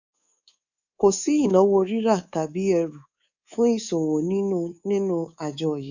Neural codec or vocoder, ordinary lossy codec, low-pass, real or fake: codec, 24 kHz, 3.1 kbps, DualCodec; none; 7.2 kHz; fake